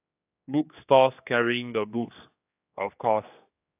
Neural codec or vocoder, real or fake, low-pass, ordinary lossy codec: codec, 16 kHz, 2 kbps, X-Codec, HuBERT features, trained on general audio; fake; 3.6 kHz; AAC, 32 kbps